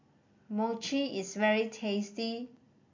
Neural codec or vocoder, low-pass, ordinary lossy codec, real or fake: none; 7.2 kHz; MP3, 48 kbps; real